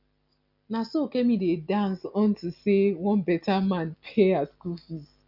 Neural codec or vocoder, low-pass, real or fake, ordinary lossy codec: none; 5.4 kHz; real; none